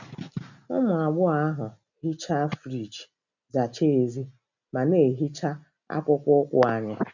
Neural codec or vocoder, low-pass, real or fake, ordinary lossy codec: none; 7.2 kHz; real; none